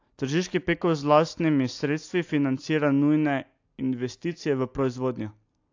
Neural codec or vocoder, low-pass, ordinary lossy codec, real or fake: none; 7.2 kHz; AAC, 48 kbps; real